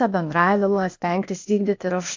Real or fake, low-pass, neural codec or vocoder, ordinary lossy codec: fake; 7.2 kHz; codec, 16 kHz, 0.8 kbps, ZipCodec; MP3, 48 kbps